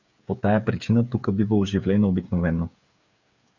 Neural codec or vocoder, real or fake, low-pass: codec, 16 kHz, 8 kbps, FreqCodec, smaller model; fake; 7.2 kHz